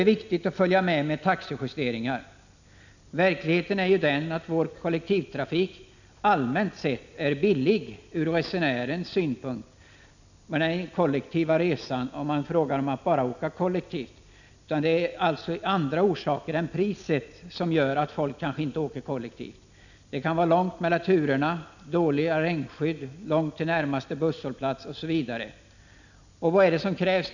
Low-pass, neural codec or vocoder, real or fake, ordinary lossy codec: 7.2 kHz; none; real; none